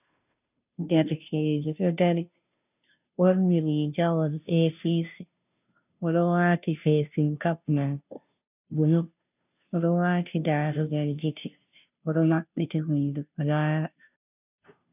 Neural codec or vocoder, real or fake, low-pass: codec, 16 kHz, 0.5 kbps, FunCodec, trained on Chinese and English, 25 frames a second; fake; 3.6 kHz